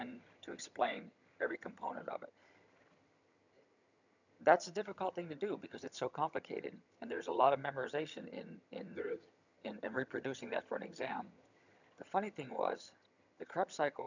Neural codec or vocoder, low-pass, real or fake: vocoder, 22.05 kHz, 80 mel bands, HiFi-GAN; 7.2 kHz; fake